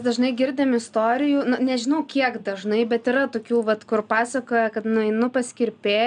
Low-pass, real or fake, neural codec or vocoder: 9.9 kHz; real; none